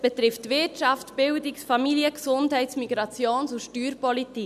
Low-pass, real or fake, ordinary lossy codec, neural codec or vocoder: 14.4 kHz; real; none; none